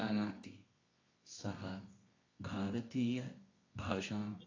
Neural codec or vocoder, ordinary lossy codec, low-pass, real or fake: codec, 24 kHz, 0.9 kbps, WavTokenizer, medium music audio release; AAC, 32 kbps; 7.2 kHz; fake